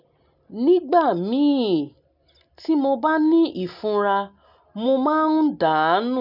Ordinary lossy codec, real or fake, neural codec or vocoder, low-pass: none; real; none; 5.4 kHz